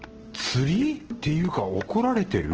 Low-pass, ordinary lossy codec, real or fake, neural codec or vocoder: 7.2 kHz; Opus, 16 kbps; real; none